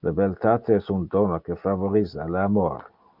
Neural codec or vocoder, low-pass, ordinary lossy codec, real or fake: none; 5.4 kHz; Opus, 24 kbps; real